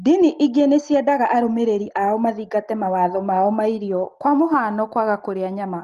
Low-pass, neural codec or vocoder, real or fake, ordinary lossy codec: 14.4 kHz; none; real; Opus, 24 kbps